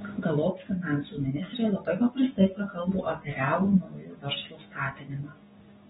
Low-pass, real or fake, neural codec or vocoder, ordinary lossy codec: 7.2 kHz; fake; codec, 16 kHz, 6 kbps, DAC; AAC, 16 kbps